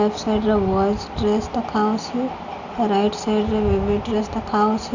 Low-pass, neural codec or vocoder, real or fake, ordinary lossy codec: 7.2 kHz; none; real; none